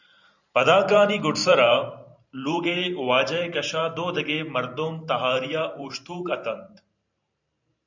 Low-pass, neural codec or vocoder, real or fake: 7.2 kHz; vocoder, 44.1 kHz, 128 mel bands every 512 samples, BigVGAN v2; fake